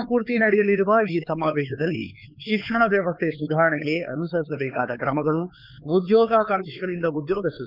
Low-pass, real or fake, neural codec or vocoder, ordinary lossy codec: 5.4 kHz; fake; codec, 16 kHz, 4 kbps, X-Codec, HuBERT features, trained on LibriSpeech; none